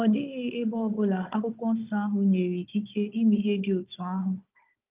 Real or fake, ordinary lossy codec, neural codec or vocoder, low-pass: fake; Opus, 32 kbps; codec, 16 kHz, 16 kbps, FunCodec, trained on Chinese and English, 50 frames a second; 3.6 kHz